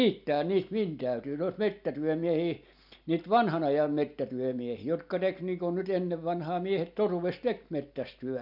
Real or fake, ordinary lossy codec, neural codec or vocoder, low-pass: real; none; none; 5.4 kHz